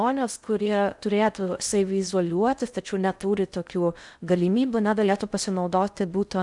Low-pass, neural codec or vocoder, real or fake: 10.8 kHz; codec, 16 kHz in and 24 kHz out, 0.6 kbps, FocalCodec, streaming, 2048 codes; fake